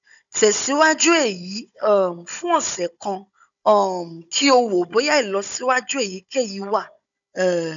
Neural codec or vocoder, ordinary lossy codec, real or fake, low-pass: codec, 16 kHz, 16 kbps, FunCodec, trained on Chinese and English, 50 frames a second; none; fake; 7.2 kHz